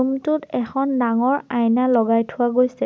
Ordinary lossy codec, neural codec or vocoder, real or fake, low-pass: none; none; real; none